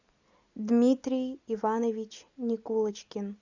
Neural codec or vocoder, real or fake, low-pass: none; real; 7.2 kHz